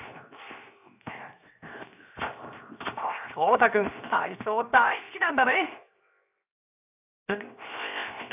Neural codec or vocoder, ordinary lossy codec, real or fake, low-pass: codec, 16 kHz, 0.7 kbps, FocalCodec; none; fake; 3.6 kHz